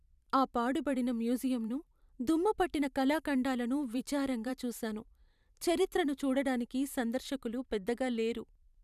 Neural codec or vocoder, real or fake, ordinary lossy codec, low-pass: vocoder, 44.1 kHz, 128 mel bands every 512 samples, BigVGAN v2; fake; none; 14.4 kHz